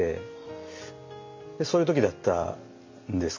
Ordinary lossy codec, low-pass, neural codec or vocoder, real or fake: MP3, 64 kbps; 7.2 kHz; none; real